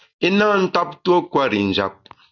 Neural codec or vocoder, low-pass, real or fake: none; 7.2 kHz; real